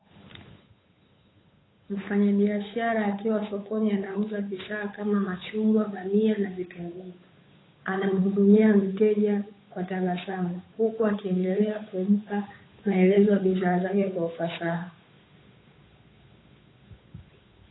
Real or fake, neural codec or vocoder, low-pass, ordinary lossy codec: fake; codec, 16 kHz, 8 kbps, FunCodec, trained on Chinese and English, 25 frames a second; 7.2 kHz; AAC, 16 kbps